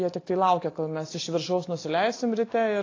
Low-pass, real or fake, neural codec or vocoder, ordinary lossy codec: 7.2 kHz; real; none; AAC, 32 kbps